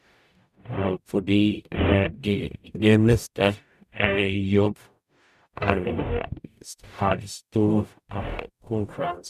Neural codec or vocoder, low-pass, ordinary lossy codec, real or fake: codec, 44.1 kHz, 0.9 kbps, DAC; 14.4 kHz; none; fake